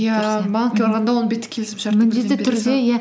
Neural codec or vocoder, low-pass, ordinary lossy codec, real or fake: none; none; none; real